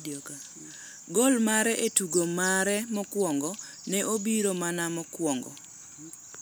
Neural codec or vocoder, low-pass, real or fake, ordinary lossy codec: none; none; real; none